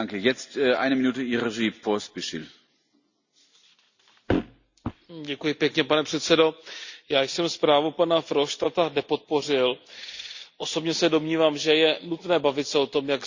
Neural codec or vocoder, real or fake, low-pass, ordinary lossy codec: none; real; 7.2 kHz; Opus, 64 kbps